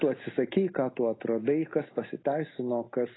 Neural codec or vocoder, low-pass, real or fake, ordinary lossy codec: none; 7.2 kHz; real; AAC, 16 kbps